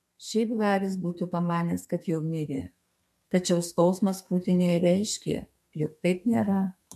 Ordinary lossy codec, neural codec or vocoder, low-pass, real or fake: MP3, 96 kbps; codec, 32 kHz, 1.9 kbps, SNAC; 14.4 kHz; fake